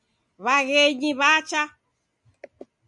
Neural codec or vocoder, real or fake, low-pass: none; real; 10.8 kHz